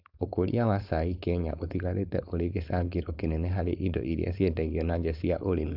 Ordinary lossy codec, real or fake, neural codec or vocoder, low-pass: AAC, 48 kbps; fake; codec, 16 kHz, 4.8 kbps, FACodec; 5.4 kHz